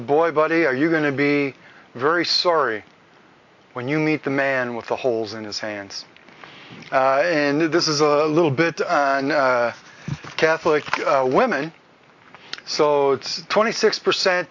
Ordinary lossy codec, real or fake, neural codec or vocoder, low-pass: AAC, 48 kbps; real; none; 7.2 kHz